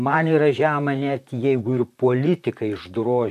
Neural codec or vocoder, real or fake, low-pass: vocoder, 44.1 kHz, 128 mel bands, Pupu-Vocoder; fake; 14.4 kHz